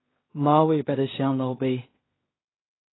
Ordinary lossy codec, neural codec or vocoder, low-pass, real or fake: AAC, 16 kbps; codec, 16 kHz in and 24 kHz out, 0.4 kbps, LongCat-Audio-Codec, two codebook decoder; 7.2 kHz; fake